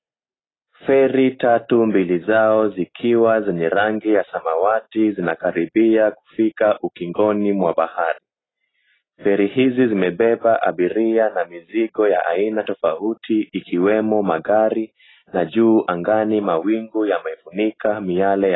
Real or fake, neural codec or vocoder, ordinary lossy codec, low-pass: real; none; AAC, 16 kbps; 7.2 kHz